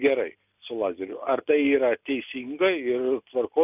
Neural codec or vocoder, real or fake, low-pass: none; real; 3.6 kHz